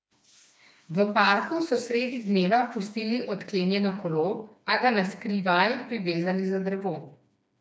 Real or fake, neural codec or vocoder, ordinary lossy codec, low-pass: fake; codec, 16 kHz, 2 kbps, FreqCodec, smaller model; none; none